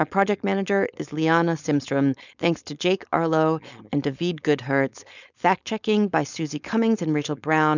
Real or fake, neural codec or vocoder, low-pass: fake; codec, 16 kHz, 4.8 kbps, FACodec; 7.2 kHz